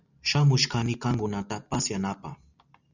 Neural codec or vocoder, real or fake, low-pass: none; real; 7.2 kHz